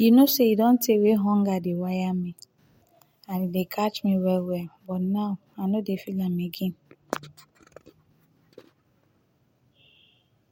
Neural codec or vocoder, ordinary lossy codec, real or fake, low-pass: none; MP3, 64 kbps; real; 19.8 kHz